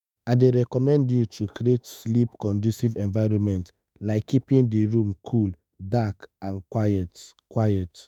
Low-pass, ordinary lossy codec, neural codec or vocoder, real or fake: 19.8 kHz; none; autoencoder, 48 kHz, 32 numbers a frame, DAC-VAE, trained on Japanese speech; fake